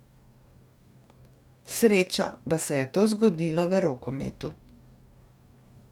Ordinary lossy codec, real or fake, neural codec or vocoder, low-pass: none; fake; codec, 44.1 kHz, 2.6 kbps, DAC; 19.8 kHz